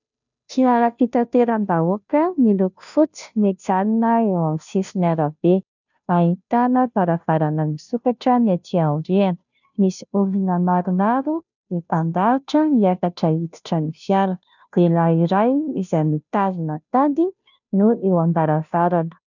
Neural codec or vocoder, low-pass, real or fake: codec, 16 kHz, 0.5 kbps, FunCodec, trained on Chinese and English, 25 frames a second; 7.2 kHz; fake